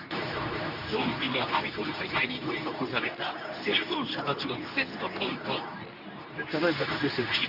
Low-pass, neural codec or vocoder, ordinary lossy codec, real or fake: 5.4 kHz; codec, 24 kHz, 0.9 kbps, WavTokenizer, medium speech release version 2; none; fake